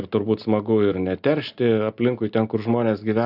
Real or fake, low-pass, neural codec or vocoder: real; 5.4 kHz; none